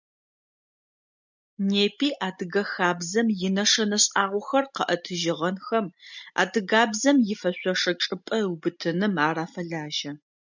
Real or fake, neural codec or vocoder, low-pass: real; none; 7.2 kHz